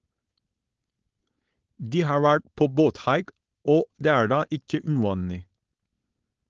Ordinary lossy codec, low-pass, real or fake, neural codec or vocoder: Opus, 16 kbps; 7.2 kHz; fake; codec, 16 kHz, 4.8 kbps, FACodec